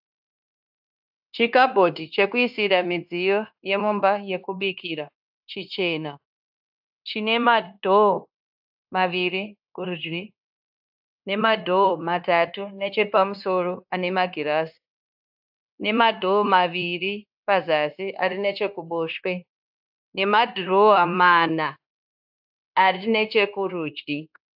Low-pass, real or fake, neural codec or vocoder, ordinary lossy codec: 5.4 kHz; fake; codec, 16 kHz, 0.9 kbps, LongCat-Audio-Codec; AAC, 48 kbps